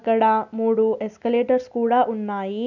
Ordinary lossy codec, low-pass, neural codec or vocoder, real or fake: none; 7.2 kHz; none; real